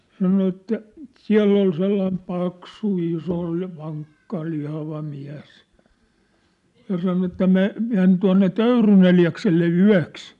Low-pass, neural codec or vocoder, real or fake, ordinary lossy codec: 10.8 kHz; none; real; none